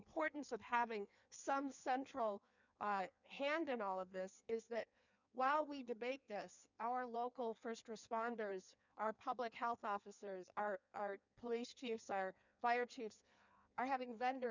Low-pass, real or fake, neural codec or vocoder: 7.2 kHz; fake; codec, 16 kHz in and 24 kHz out, 1.1 kbps, FireRedTTS-2 codec